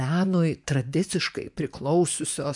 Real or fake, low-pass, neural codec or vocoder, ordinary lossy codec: fake; 10.8 kHz; vocoder, 24 kHz, 100 mel bands, Vocos; MP3, 96 kbps